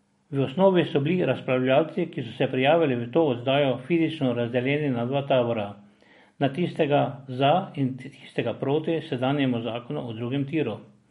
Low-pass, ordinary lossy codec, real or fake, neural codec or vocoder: 19.8 kHz; MP3, 48 kbps; real; none